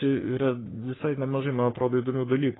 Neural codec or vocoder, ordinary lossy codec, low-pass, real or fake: codec, 44.1 kHz, 3.4 kbps, Pupu-Codec; AAC, 16 kbps; 7.2 kHz; fake